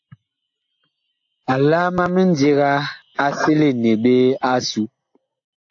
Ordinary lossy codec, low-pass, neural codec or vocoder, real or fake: AAC, 48 kbps; 7.2 kHz; none; real